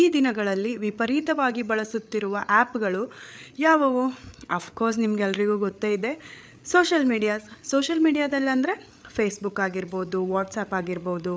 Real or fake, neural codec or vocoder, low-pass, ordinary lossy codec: fake; codec, 16 kHz, 8 kbps, FreqCodec, larger model; none; none